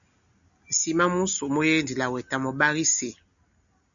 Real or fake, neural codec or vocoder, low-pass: real; none; 7.2 kHz